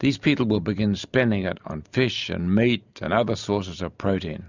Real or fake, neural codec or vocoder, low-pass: real; none; 7.2 kHz